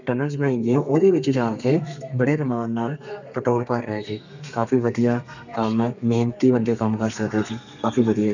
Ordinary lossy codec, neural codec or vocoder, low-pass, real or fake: none; codec, 32 kHz, 1.9 kbps, SNAC; 7.2 kHz; fake